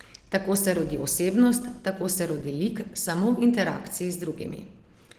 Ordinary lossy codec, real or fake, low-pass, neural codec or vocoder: Opus, 16 kbps; real; 14.4 kHz; none